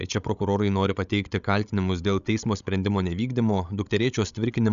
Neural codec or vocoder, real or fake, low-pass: codec, 16 kHz, 16 kbps, FreqCodec, larger model; fake; 7.2 kHz